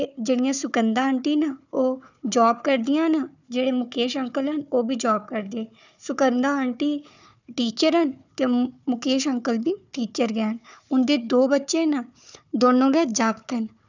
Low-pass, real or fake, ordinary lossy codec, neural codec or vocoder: 7.2 kHz; fake; none; codec, 16 kHz, 4 kbps, FunCodec, trained on Chinese and English, 50 frames a second